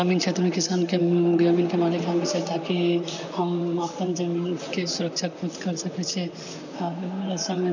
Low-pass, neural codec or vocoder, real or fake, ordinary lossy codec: 7.2 kHz; vocoder, 44.1 kHz, 128 mel bands, Pupu-Vocoder; fake; none